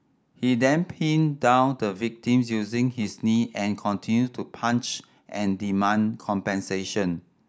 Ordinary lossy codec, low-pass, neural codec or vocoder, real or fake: none; none; none; real